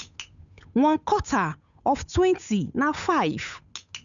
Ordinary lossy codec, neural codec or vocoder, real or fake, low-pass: none; codec, 16 kHz, 8 kbps, FunCodec, trained on Chinese and English, 25 frames a second; fake; 7.2 kHz